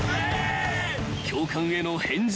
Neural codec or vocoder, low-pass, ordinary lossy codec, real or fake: none; none; none; real